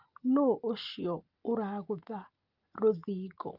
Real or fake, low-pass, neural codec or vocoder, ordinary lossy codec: real; 5.4 kHz; none; Opus, 24 kbps